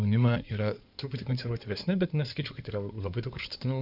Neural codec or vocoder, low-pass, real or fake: codec, 16 kHz in and 24 kHz out, 2.2 kbps, FireRedTTS-2 codec; 5.4 kHz; fake